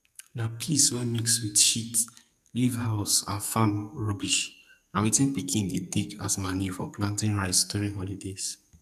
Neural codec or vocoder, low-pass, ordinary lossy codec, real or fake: codec, 44.1 kHz, 2.6 kbps, SNAC; 14.4 kHz; none; fake